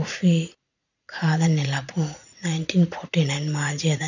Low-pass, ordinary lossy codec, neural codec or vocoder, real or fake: 7.2 kHz; none; vocoder, 44.1 kHz, 80 mel bands, Vocos; fake